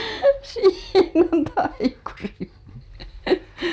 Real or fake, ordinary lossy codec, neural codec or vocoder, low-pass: real; none; none; none